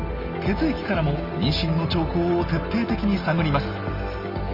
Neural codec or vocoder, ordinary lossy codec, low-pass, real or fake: none; Opus, 32 kbps; 5.4 kHz; real